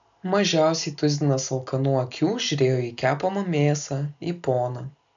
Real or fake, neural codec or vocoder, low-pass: real; none; 7.2 kHz